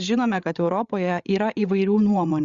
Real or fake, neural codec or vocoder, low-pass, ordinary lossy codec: fake; codec, 16 kHz, 8 kbps, FreqCodec, larger model; 7.2 kHz; Opus, 64 kbps